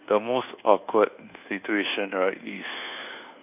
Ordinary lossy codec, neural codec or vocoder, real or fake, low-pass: none; codec, 24 kHz, 1.2 kbps, DualCodec; fake; 3.6 kHz